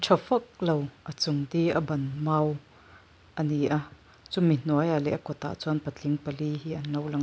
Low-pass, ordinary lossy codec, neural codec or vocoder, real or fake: none; none; none; real